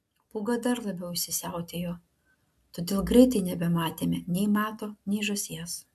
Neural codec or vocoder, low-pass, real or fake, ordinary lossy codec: none; 14.4 kHz; real; AAC, 96 kbps